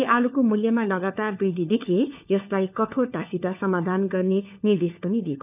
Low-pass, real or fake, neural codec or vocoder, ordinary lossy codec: 3.6 kHz; fake; codec, 16 kHz, 4 kbps, FunCodec, trained on LibriTTS, 50 frames a second; none